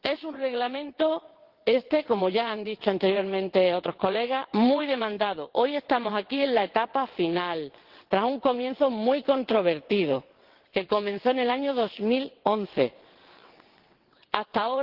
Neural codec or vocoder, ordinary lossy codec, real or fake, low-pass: vocoder, 22.05 kHz, 80 mel bands, WaveNeXt; Opus, 16 kbps; fake; 5.4 kHz